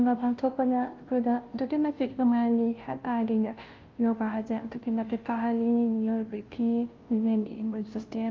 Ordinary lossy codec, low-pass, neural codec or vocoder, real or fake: Opus, 32 kbps; 7.2 kHz; codec, 16 kHz, 0.5 kbps, FunCodec, trained on Chinese and English, 25 frames a second; fake